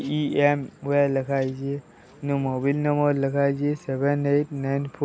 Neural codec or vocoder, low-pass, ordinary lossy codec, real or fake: none; none; none; real